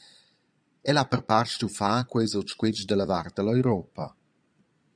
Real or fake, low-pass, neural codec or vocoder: fake; 9.9 kHz; vocoder, 22.05 kHz, 80 mel bands, Vocos